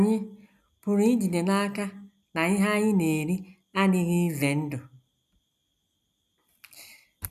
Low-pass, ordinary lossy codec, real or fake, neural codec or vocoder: 14.4 kHz; none; real; none